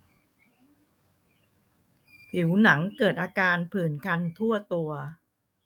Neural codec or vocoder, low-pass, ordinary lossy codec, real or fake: codec, 44.1 kHz, 7.8 kbps, DAC; 19.8 kHz; none; fake